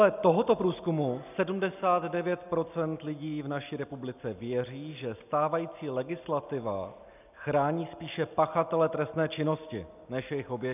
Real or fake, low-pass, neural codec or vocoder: real; 3.6 kHz; none